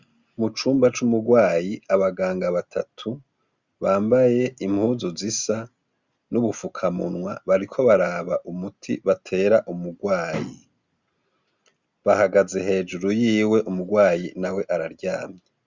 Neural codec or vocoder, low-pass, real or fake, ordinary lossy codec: none; 7.2 kHz; real; Opus, 64 kbps